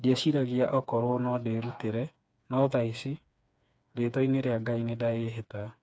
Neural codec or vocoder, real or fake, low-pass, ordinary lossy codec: codec, 16 kHz, 4 kbps, FreqCodec, smaller model; fake; none; none